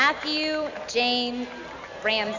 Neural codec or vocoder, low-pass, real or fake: none; 7.2 kHz; real